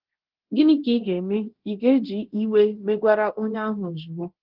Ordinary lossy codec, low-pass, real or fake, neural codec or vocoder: Opus, 16 kbps; 5.4 kHz; fake; codec, 24 kHz, 0.9 kbps, DualCodec